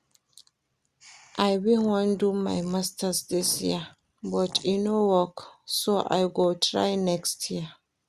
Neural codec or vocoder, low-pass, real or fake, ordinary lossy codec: none; 14.4 kHz; real; Opus, 64 kbps